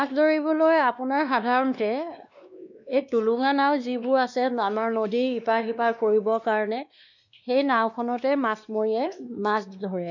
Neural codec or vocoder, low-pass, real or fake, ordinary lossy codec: codec, 16 kHz, 2 kbps, X-Codec, WavLM features, trained on Multilingual LibriSpeech; 7.2 kHz; fake; none